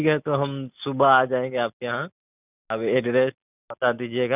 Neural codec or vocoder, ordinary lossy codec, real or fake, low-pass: none; none; real; 3.6 kHz